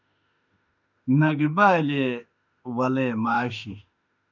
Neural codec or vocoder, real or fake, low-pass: autoencoder, 48 kHz, 32 numbers a frame, DAC-VAE, trained on Japanese speech; fake; 7.2 kHz